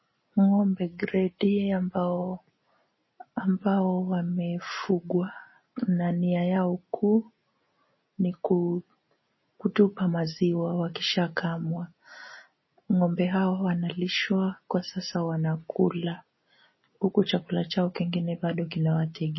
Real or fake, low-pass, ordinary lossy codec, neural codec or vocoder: real; 7.2 kHz; MP3, 24 kbps; none